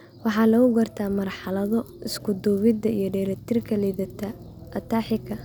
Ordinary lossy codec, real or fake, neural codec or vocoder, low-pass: none; real; none; none